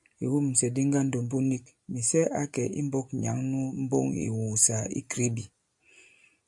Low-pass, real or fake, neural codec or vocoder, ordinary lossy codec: 10.8 kHz; real; none; AAC, 64 kbps